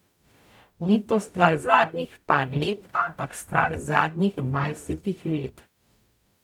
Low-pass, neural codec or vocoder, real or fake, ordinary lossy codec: 19.8 kHz; codec, 44.1 kHz, 0.9 kbps, DAC; fake; none